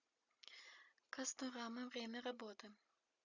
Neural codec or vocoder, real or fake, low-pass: none; real; 7.2 kHz